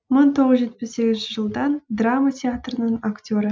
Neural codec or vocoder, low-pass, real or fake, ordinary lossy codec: none; none; real; none